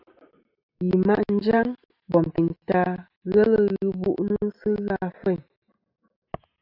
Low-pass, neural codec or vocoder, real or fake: 5.4 kHz; none; real